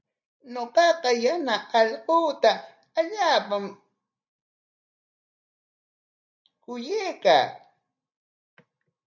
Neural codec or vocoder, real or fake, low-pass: none; real; 7.2 kHz